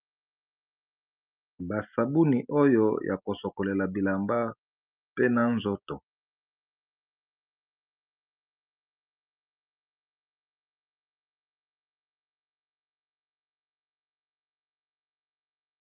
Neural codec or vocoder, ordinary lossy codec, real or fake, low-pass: none; Opus, 24 kbps; real; 3.6 kHz